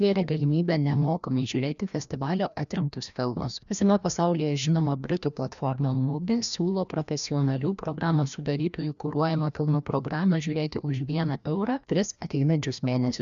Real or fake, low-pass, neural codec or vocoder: fake; 7.2 kHz; codec, 16 kHz, 1 kbps, FreqCodec, larger model